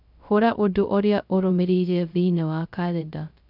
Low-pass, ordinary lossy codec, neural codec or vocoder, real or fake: 5.4 kHz; none; codec, 16 kHz, 0.2 kbps, FocalCodec; fake